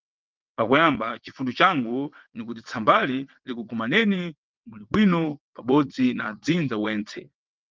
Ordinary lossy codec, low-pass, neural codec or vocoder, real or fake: Opus, 32 kbps; 7.2 kHz; vocoder, 22.05 kHz, 80 mel bands, WaveNeXt; fake